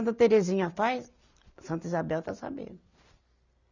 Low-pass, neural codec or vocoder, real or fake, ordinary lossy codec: 7.2 kHz; none; real; none